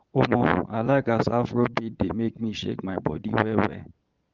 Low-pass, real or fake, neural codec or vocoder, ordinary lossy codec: 7.2 kHz; fake; vocoder, 22.05 kHz, 80 mel bands, Vocos; Opus, 32 kbps